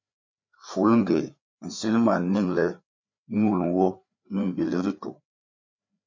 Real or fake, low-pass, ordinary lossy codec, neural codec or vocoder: fake; 7.2 kHz; MP3, 64 kbps; codec, 16 kHz, 4 kbps, FreqCodec, larger model